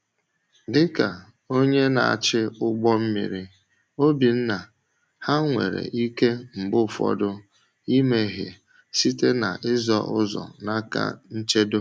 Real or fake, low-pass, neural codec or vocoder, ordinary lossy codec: real; none; none; none